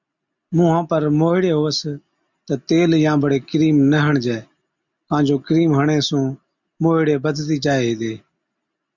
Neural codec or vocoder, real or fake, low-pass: none; real; 7.2 kHz